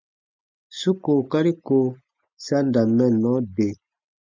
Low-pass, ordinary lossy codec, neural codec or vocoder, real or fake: 7.2 kHz; MP3, 64 kbps; none; real